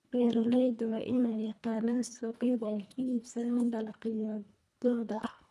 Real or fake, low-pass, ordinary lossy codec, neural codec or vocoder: fake; none; none; codec, 24 kHz, 1.5 kbps, HILCodec